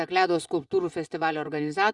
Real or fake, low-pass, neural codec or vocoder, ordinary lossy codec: fake; 10.8 kHz; vocoder, 24 kHz, 100 mel bands, Vocos; Opus, 64 kbps